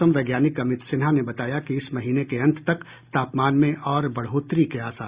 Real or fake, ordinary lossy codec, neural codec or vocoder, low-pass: real; Opus, 64 kbps; none; 3.6 kHz